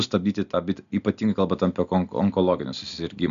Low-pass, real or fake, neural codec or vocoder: 7.2 kHz; real; none